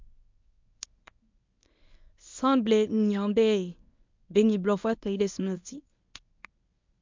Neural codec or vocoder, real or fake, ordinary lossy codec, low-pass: codec, 24 kHz, 0.9 kbps, WavTokenizer, medium speech release version 1; fake; MP3, 64 kbps; 7.2 kHz